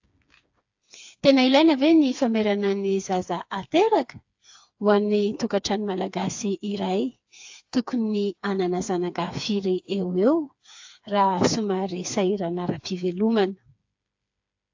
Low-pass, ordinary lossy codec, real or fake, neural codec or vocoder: 7.2 kHz; AAC, 48 kbps; fake; codec, 16 kHz, 4 kbps, FreqCodec, smaller model